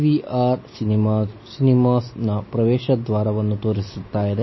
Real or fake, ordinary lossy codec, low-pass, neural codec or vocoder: real; MP3, 24 kbps; 7.2 kHz; none